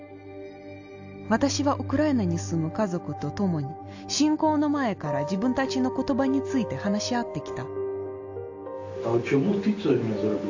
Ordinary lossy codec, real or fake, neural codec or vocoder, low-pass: AAC, 48 kbps; real; none; 7.2 kHz